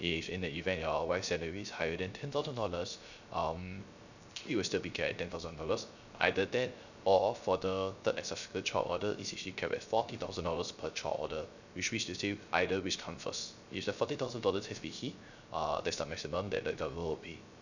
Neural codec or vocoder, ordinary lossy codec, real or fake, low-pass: codec, 16 kHz, 0.3 kbps, FocalCodec; none; fake; 7.2 kHz